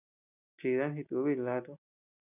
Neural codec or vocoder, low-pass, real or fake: none; 3.6 kHz; real